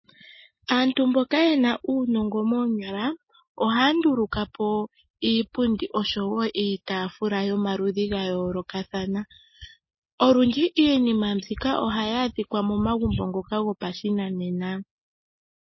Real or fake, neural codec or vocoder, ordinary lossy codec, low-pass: real; none; MP3, 24 kbps; 7.2 kHz